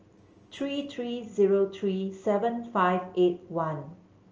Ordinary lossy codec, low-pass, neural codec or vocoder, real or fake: Opus, 24 kbps; 7.2 kHz; none; real